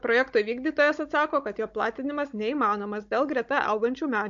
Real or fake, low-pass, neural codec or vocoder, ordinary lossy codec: fake; 7.2 kHz; codec, 16 kHz, 16 kbps, FunCodec, trained on Chinese and English, 50 frames a second; MP3, 48 kbps